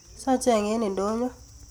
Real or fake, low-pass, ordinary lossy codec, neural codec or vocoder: real; none; none; none